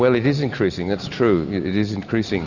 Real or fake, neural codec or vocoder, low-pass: real; none; 7.2 kHz